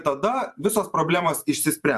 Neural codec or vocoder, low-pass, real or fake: vocoder, 44.1 kHz, 128 mel bands every 512 samples, BigVGAN v2; 14.4 kHz; fake